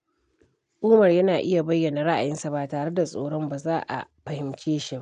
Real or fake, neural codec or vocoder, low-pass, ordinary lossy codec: real; none; 9.9 kHz; none